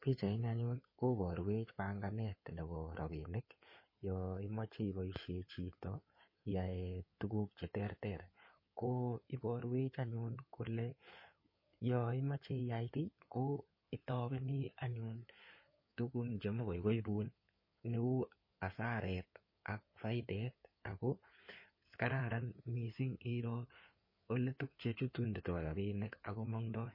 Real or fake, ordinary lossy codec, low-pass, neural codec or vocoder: fake; MP3, 24 kbps; 5.4 kHz; codec, 16 kHz in and 24 kHz out, 2.2 kbps, FireRedTTS-2 codec